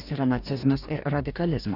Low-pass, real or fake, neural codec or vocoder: 5.4 kHz; fake; codec, 44.1 kHz, 2.6 kbps, DAC